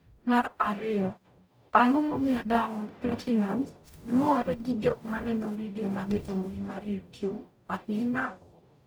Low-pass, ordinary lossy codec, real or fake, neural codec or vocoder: none; none; fake; codec, 44.1 kHz, 0.9 kbps, DAC